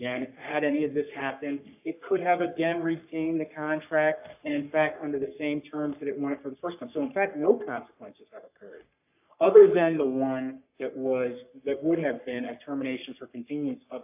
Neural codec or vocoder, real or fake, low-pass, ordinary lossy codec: codec, 44.1 kHz, 3.4 kbps, Pupu-Codec; fake; 3.6 kHz; AAC, 32 kbps